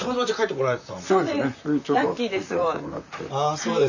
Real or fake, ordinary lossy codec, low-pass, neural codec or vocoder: fake; none; 7.2 kHz; vocoder, 44.1 kHz, 128 mel bands, Pupu-Vocoder